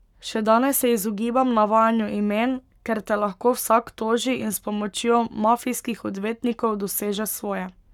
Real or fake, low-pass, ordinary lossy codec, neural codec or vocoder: fake; 19.8 kHz; none; codec, 44.1 kHz, 7.8 kbps, Pupu-Codec